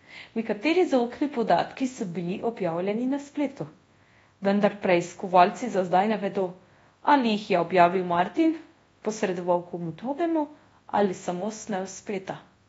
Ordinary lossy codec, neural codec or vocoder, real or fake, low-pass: AAC, 24 kbps; codec, 24 kHz, 0.9 kbps, WavTokenizer, large speech release; fake; 10.8 kHz